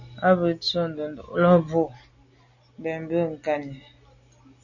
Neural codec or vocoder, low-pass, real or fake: none; 7.2 kHz; real